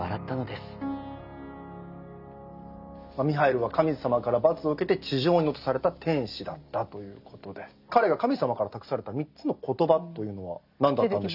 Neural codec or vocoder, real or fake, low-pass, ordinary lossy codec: none; real; 5.4 kHz; none